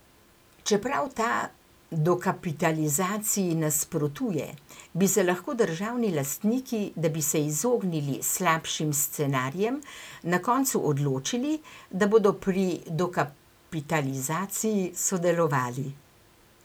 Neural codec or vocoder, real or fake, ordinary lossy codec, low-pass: none; real; none; none